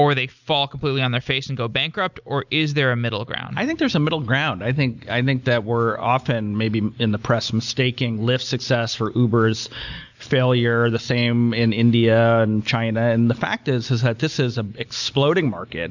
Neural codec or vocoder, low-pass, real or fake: none; 7.2 kHz; real